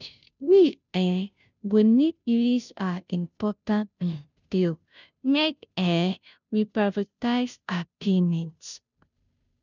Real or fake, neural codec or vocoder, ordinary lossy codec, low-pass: fake; codec, 16 kHz, 0.5 kbps, FunCodec, trained on Chinese and English, 25 frames a second; none; 7.2 kHz